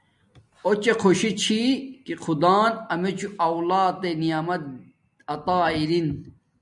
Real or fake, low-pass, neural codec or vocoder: real; 10.8 kHz; none